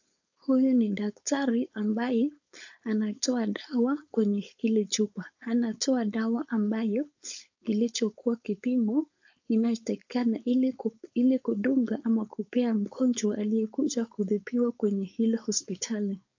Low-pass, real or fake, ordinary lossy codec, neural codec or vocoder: 7.2 kHz; fake; AAC, 48 kbps; codec, 16 kHz, 4.8 kbps, FACodec